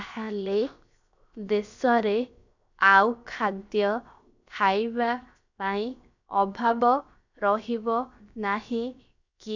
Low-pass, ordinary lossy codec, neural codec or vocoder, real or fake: 7.2 kHz; none; codec, 16 kHz, 0.7 kbps, FocalCodec; fake